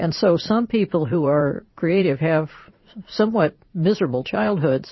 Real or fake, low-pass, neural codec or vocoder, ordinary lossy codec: fake; 7.2 kHz; vocoder, 44.1 kHz, 80 mel bands, Vocos; MP3, 24 kbps